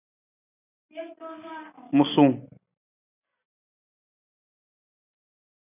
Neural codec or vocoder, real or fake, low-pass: none; real; 3.6 kHz